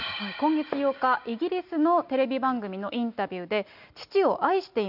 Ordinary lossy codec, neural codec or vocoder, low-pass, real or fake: none; none; 5.4 kHz; real